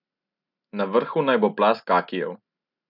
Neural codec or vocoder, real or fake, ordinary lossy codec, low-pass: none; real; none; 5.4 kHz